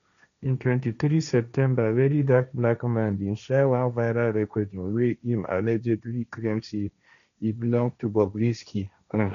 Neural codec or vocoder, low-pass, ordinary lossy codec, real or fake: codec, 16 kHz, 1.1 kbps, Voila-Tokenizer; 7.2 kHz; none; fake